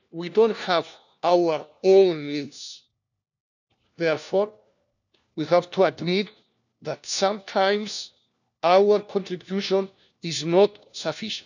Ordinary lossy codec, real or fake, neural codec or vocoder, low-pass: none; fake; codec, 16 kHz, 1 kbps, FunCodec, trained on LibriTTS, 50 frames a second; 7.2 kHz